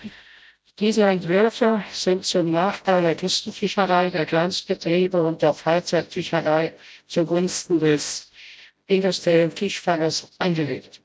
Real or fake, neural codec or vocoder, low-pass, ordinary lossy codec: fake; codec, 16 kHz, 0.5 kbps, FreqCodec, smaller model; none; none